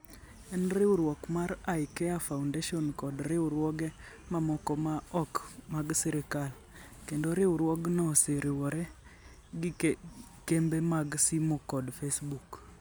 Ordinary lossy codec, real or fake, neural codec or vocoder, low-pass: none; real; none; none